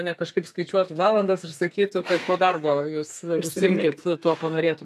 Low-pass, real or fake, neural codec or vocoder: 14.4 kHz; fake; codec, 32 kHz, 1.9 kbps, SNAC